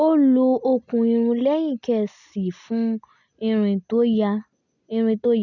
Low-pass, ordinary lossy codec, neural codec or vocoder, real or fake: 7.2 kHz; none; none; real